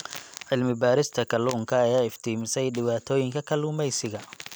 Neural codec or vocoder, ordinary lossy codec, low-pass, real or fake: none; none; none; real